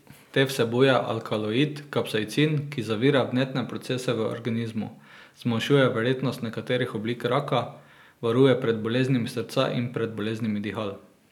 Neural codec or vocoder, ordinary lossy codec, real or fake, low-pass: none; none; real; 19.8 kHz